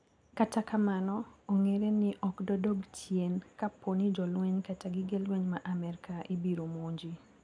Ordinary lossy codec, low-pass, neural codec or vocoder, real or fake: none; 9.9 kHz; none; real